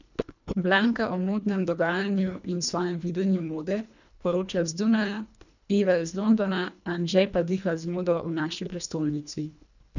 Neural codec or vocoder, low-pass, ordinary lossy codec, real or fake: codec, 24 kHz, 1.5 kbps, HILCodec; 7.2 kHz; none; fake